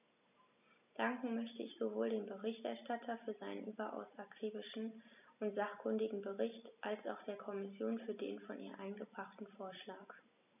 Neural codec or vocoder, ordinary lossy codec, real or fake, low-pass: none; none; real; 3.6 kHz